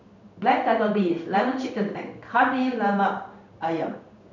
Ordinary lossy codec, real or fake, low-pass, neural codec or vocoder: none; fake; 7.2 kHz; codec, 16 kHz in and 24 kHz out, 1 kbps, XY-Tokenizer